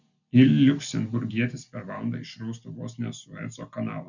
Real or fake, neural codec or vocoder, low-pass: real; none; 7.2 kHz